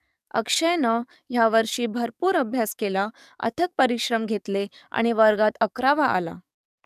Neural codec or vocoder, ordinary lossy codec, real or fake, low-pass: codec, 44.1 kHz, 7.8 kbps, DAC; none; fake; 14.4 kHz